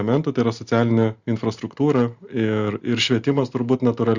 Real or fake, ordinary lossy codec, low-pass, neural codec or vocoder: real; Opus, 64 kbps; 7.2 kHz; none